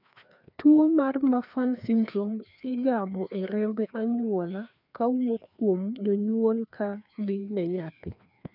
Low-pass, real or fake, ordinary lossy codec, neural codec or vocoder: 5.4 kHz; fake; none; codec, 16 kHz, 2 kbps, FreqCodec, larger model